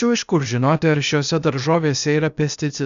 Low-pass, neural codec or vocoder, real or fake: 7.2 kHz; codec, 16 kHz, 0.8 kbps, ZipCodec; fake